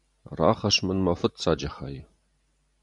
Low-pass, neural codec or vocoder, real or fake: 10.8 kHz; none; real